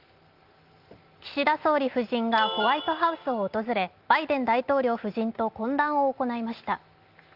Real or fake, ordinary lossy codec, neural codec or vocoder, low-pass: real; Opus, 24 kbps; none; 5.4 kHz